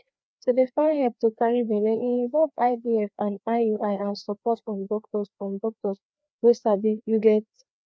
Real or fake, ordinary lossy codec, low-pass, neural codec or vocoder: fake; none; none; codec, 16 kHz, 2 kbps, FreqCodec, larger model